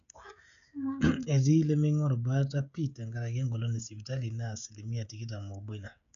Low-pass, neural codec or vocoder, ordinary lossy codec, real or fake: 7.2 kHz; codec, 16 kHz, 16 kbps, FreqCodec, smaller model; none; fake